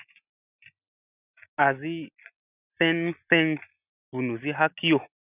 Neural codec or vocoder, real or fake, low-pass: none; real; 3.6 kHz